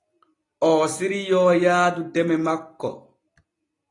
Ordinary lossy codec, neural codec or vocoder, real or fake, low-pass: AAC, 32 kbps; none; real; 10.8 kHz